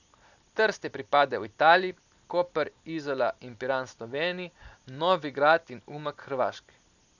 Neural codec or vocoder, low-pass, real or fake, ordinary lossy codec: none; 7.2 kHz; real; none